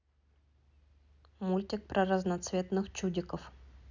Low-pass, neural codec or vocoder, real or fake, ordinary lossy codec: 7.2 kHz; none; real; none